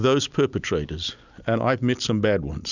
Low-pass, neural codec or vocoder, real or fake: 7.2 kHz; none; real